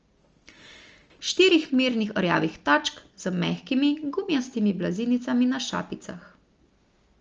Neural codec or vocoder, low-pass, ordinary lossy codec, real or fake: none; 7.2 kHz; Opus, 24 kbps; real